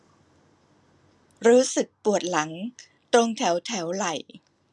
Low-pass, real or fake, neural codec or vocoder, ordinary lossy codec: none; real; none; none